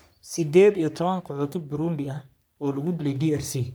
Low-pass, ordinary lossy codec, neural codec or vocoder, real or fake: none; none; codec, 44.1 kHz, 3.4 kbps, Pupu-Codec; fake